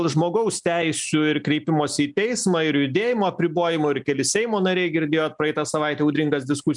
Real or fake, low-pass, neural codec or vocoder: real; 10.8 kHz; none